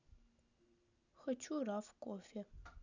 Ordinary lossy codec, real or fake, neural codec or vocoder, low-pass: none; real; none; 7.2 kHz